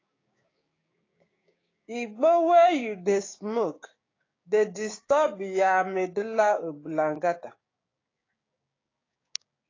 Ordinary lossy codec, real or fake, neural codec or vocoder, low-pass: AAC, 32 kbps; fake; codec, 16 kHz, 6 kbps, DAC; 7.2 kHz